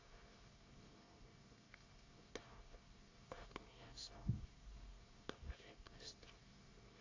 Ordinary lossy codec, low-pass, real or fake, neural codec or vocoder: AAC, 32 kbps; 7.2 kHz; fake; codec, 24 kHz, 1 kbps, SNAC